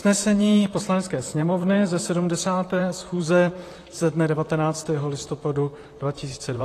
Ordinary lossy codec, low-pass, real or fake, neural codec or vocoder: AAC, 48 kbps; 14.4 kHz; fake; vocoder, 44.1 kHz, 128 mel bands, Pupu-Vocoder